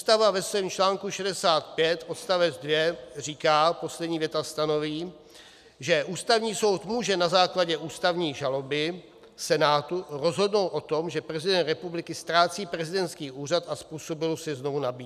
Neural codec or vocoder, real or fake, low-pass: none; real; 14.4 kHz